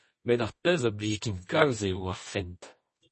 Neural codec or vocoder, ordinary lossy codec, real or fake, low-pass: codec, 24 kHz, 0.9 kbps, WavTokenizer, medium music audio release; MP3, 32 kbps; fake; 10.8 kHz